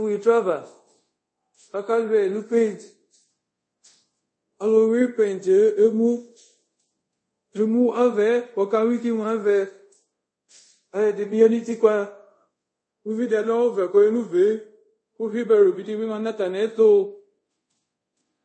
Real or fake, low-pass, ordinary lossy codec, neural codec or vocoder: fake; 9.9 kHz; MP3, 32 kbps; codec, 24 kHz, 0.5 kbps, DualCodec